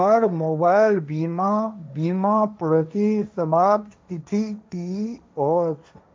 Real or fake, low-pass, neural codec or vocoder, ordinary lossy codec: fake; none; codec, 16 kHz, 1.1 kbps, Voila-Tokenizer; none